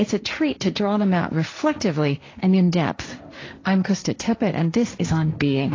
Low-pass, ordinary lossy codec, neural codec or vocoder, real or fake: 7.2 kHz; AAC, 32 kbps; codec, 16 kHz, 1.1 kbps, Voila-Tokenizer; fake